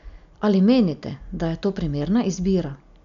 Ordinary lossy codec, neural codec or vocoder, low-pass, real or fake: none; none; 7.2 kHz; real